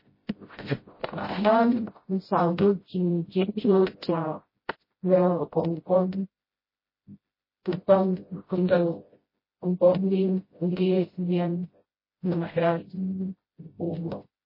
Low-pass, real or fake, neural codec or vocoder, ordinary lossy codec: 5.4 kHz; fake; codec, 16 kHz, 0.5 kbps, FreqCodec, smaller model; MP3, 24 kbps